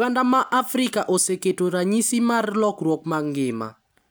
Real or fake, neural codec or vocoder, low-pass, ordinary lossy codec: real; none; none; none